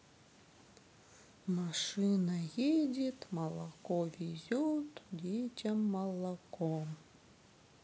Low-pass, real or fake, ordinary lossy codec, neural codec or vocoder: none; real; none; none